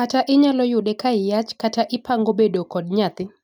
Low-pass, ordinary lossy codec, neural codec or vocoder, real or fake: 19.8 kHz; none; none; real